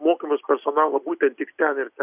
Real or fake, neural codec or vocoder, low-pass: real; none; 3.6 kHz